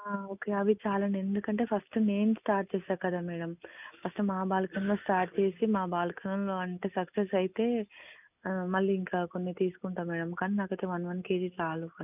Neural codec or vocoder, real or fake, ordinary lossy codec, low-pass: none; real; none; 3.6 kHz